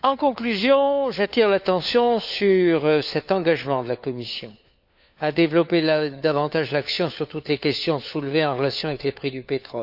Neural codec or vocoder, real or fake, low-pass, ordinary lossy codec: codec, 16 kHz, 4 kbps, FunCodec, trained on LibriTTS, 50 frames a second; fake; 5.4 kHz; none